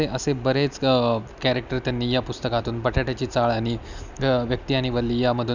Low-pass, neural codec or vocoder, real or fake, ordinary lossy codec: 7.2 kHz; none; real; none